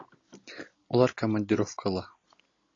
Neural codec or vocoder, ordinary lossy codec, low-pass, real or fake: none; AAC, 32 kbps; 7.2 kHz; real